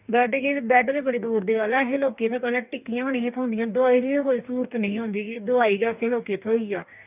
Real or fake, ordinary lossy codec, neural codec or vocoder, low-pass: fake; none; codec, 44.1 kHz, 2.6 kbps, DAC; 3.6 kHz